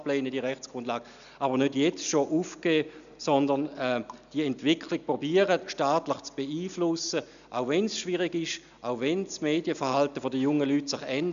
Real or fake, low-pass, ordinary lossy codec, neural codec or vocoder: real; 7.2 kHz; none; none